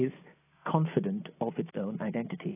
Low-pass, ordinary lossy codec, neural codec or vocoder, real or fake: 3.6 kHz; AAC, 16 kbps; none; real